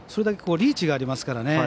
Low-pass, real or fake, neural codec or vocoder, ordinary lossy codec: none; real; none; none